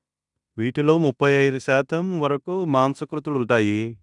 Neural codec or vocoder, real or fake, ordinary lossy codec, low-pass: codec, 16 kHz in and 24 kHz out, 0.9 kbps, LongCat-Audio-Codec, fine tuned four codebook decoder; fake; none; 10.8 kHz